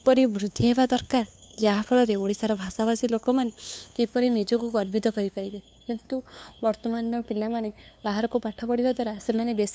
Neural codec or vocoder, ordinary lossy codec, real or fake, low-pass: codec, 16 kHz, 2 kbps, FunCodec, trained on LibriTTS, 25 frames a second; none; fake; none